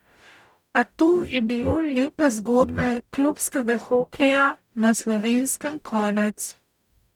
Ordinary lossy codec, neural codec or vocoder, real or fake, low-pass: none; codec, 44.1 kHz, 0.9 kbps, DAC; fake; 19.8 kHz